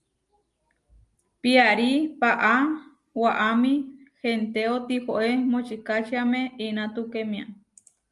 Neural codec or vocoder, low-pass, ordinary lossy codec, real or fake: none; 10.8 kHz; Opus, 32 kbps; real